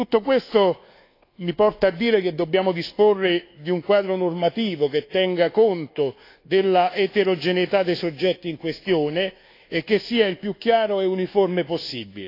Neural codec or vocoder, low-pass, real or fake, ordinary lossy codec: codec, 24 kHz, 1.2 kbps, DualCodec; 5.4 kHz; fake; AAC, 32 kbps